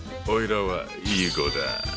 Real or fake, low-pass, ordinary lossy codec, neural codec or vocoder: real; none; none; none